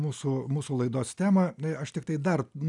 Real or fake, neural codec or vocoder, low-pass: real; none; 10.8 kHz